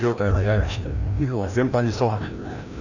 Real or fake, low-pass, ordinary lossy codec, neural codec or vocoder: fake; 7.2 kHz; none; codec, 16 kHz, 1 kbps, FreqCodec, larger model